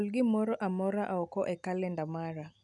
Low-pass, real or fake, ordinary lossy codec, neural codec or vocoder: 10.8 kHz; real; none; none